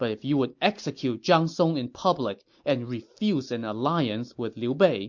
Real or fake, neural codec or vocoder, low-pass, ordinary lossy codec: real; none; 7.2 kHz; MP3, 48 kbps